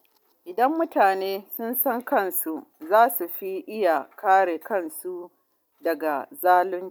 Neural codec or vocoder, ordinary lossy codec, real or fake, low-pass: none; none; real; none